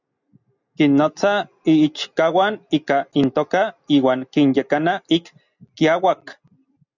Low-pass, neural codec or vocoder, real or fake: 7.2 kHz; none; real